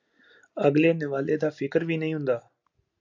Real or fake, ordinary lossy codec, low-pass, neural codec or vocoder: real; AAC, 48 kbps; 7.2 kHz; none